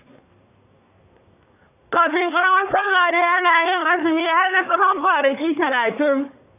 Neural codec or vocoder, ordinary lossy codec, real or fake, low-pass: codec, 24 kHz, 1 kbps, SNAC; none; fake; 3.6 kHz